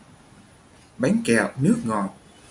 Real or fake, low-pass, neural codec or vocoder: real; 10.8 kHz; none